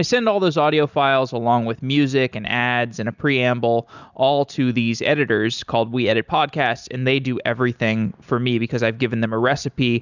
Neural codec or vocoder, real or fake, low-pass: none; real; 7.2 kHz